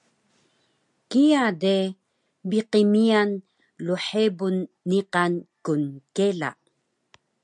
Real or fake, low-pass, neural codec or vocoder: real; 10.8 kHz; none